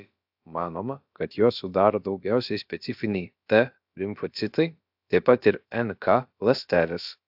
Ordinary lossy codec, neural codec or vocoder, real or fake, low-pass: AAC, 48 kbps; codec, 16 kHz, about 1 kbps, DyCAST, with the encoder's durations; fake; 5.4 kHz